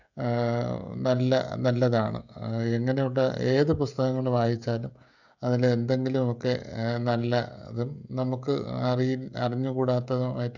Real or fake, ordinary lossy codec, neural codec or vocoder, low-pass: fake; none; codec, 16 kHz, 16 kbps, FreqCodec, smaller model; 7.2 kHz